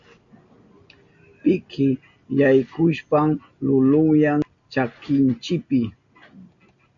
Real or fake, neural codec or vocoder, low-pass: real; none; 7.2 kHz